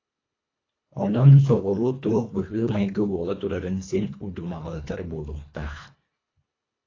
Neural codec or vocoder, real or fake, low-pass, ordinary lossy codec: codec, 24 kHz, 1.5 kbps, HILCodec; fake; 7.2 kHz; AAC, 32 kbps